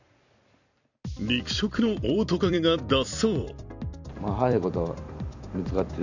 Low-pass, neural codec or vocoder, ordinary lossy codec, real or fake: 7.2 kHz; vocoder, 44.1 kHz, 128 mel bands every 256 samples, BigVGAN v2; none; fake